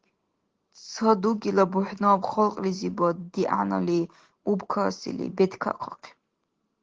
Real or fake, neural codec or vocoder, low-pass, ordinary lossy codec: real; none; 7.2 kHz; Opus, 16 kbps